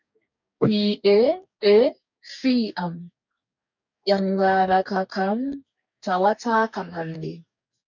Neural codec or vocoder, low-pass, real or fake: codec, 44.1 kHz, 2.6 kbps, DAC; 7.2 kHz; fake